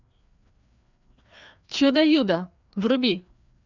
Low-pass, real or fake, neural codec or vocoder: 7.2 kHz; fake; codec, 16 kHz, 2 kbps, FreqCodec, larger model